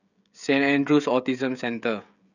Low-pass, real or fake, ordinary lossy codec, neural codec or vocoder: 7.2 kHz; fake; none; codec, 16 kHz, 16 kbps, FreqCodec, smaller model